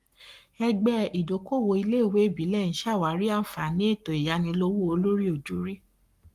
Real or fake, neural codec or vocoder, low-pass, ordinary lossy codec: fake; codec, 44.1 kHz, 7.8 kbps, Pupu-Codec; 14.4 kHz; Opus, 32 kbps